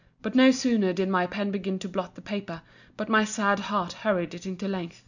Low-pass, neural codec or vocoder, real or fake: 7.2 kHz; none; real